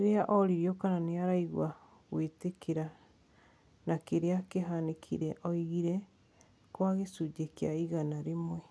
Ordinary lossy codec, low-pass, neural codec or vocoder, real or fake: none; none; none; real